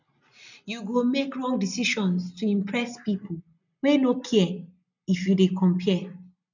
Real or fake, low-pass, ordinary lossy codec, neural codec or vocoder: real; 7.2 kHz; none; none